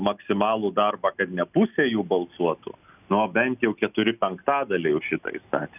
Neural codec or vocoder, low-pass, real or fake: none; 3.6 kHz; real